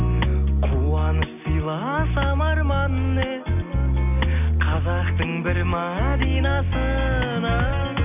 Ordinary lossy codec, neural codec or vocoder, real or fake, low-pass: none; none; real; 3.6 kHz